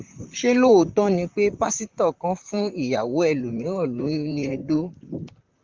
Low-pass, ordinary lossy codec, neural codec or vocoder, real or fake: 7.2 kHz; Opus, 24 kbps; vocoder, 44.1 kHz, 128 mel bands, Pupu-Vocoder; fake